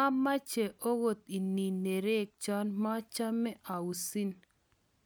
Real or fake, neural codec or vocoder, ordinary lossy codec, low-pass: real; none; none; none